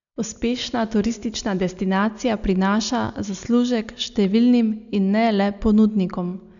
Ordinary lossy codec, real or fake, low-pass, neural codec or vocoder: none; real; 7.2 kHz; none